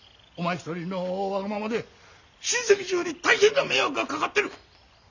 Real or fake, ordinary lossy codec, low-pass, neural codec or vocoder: real; none; 7.2 kHz; none